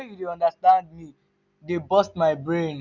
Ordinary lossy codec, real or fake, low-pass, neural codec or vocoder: none; real; 7.2 kHz; none